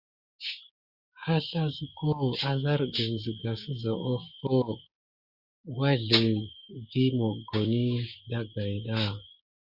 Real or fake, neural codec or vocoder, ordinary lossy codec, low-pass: fake; codec, 16 kHz, 6 kbps, DAC; Opus, 64 kbps; 5.4 kHz